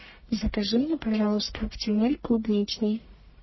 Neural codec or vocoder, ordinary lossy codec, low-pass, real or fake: codec, 44.1 kHz, 1.7 kbps, Pupu-Codec; MP3, 24 kbps; 7.2 kHz; fake